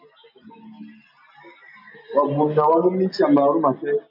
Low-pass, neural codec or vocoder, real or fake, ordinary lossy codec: 5.4 kHz; none; real; MP3, 48 kbps